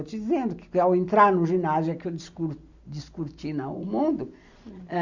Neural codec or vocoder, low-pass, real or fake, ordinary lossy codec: none; 7.2 kHz; real; Opus, 64 kbps